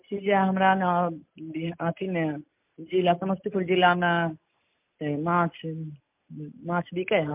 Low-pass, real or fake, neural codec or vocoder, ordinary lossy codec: 3.6 kHz; real; none; MP3, 32 kbps